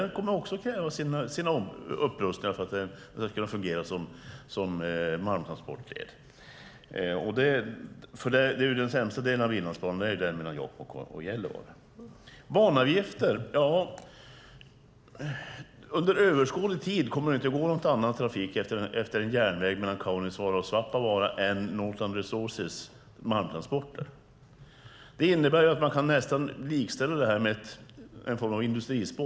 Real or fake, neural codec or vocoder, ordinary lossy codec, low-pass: real; none; none; none